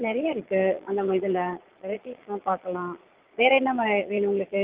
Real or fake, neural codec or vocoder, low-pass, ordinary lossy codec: fake; vocoder, 22.05 kHz, 80 mel bands, Vocos; 3.6 kHz; Opus, 32 kbps